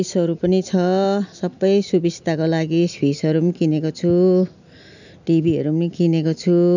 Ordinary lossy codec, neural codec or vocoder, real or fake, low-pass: none; none; real; 7.2 kHz